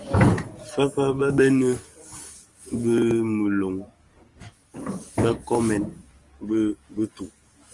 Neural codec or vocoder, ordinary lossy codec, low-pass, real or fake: codec, 44.1 kHz, 7.8 kbps, DAC; Opus, 24 kbps; 10.8 kHz; fake